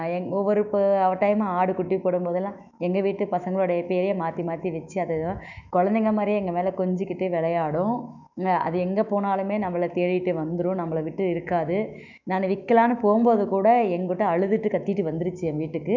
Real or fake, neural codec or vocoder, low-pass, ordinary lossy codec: fake; autoencoder, 48 kHz, 128 numbers a frame, DAC-VAE, trained on Japanese speech; 7.2 kHz; none